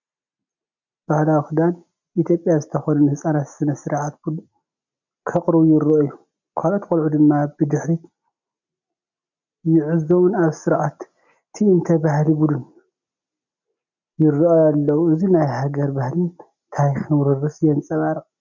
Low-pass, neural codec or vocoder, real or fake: 7.2 kHz; none; real